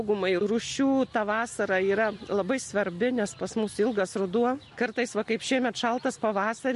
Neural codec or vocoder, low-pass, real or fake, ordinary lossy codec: none; 14.4 kHz; real; MP3, 48 kbps